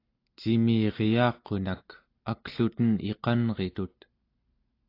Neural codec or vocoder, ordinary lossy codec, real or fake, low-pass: none; AAC, 32 kbps; real; 5.4 kHz